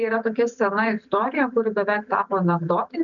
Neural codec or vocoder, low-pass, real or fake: none; 7.2 kHz; real